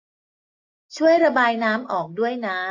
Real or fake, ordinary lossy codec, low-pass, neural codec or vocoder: real; AAC, 48 kbps; 7.2 kHz; none